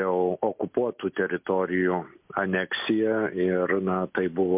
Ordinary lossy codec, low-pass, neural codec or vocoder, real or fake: MP3, 32 kbps; 3.6 kHz; none; real